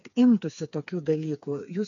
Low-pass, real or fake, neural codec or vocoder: 7.2 kHz; fake; codec, 16 kHz, 4 kbps, FreqCodec, smaller model